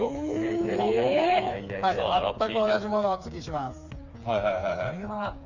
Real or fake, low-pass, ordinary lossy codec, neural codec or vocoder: fake; 7.2 kHz; none; codec, 16 kHz, 4 kbps, FreqCodec, smaller model